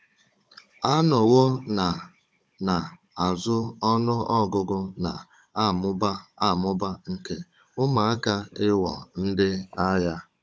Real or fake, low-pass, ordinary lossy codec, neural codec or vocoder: fake; none; none; codec, 16 kHz, 16 kbps, FunCodec, trained on Chinese and English, 50 frames a second